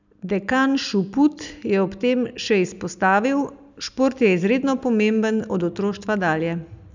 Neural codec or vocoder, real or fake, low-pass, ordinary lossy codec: none; real; 7.2 kHz; none